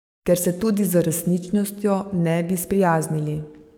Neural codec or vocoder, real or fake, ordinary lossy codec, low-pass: codec, 44.1 kHz, 7.8 kbps, DAC; fake; none; none